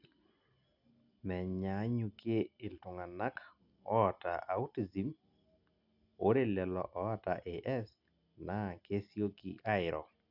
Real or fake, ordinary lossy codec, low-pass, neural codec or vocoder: real; none; 5.4 kHz; none